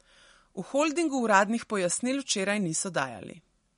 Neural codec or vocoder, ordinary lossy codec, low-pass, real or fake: none; MP3, 48 kbps; 10.8 kHz; real